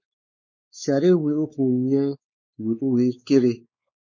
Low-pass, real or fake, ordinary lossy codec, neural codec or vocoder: 7.2 kHz; fake; MP3, 48 kbps; codec, 16 kHz, 4 kbps, X-Codec, WavLM features, trained on Multilingual LibriSpeech